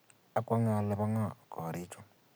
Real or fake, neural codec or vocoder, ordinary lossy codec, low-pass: real; none; none; none